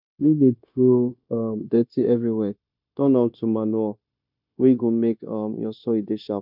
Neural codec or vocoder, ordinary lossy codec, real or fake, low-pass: codec, 16 kHz, 0.9 kbps, LongCat-Audio-Codec; none; fake; 5.4 kHz